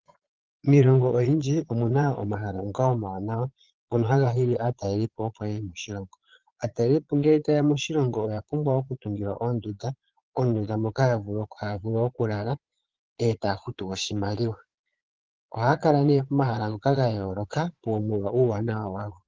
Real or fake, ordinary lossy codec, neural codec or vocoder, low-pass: fake; Opus, 16 kbps; vocoder, 22.05 kHz, 80 mel bands, Vocos; 7.2 kHz